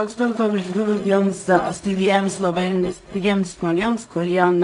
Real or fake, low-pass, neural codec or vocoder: fake; 10.8 kHz; codec, 16 kHz in and 24 kHz out, 0.4 kbps, LongCat-Audio-Codec, two codebook decoder